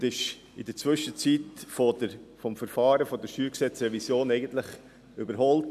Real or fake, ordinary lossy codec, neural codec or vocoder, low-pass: real; none; none; 14.4 kHz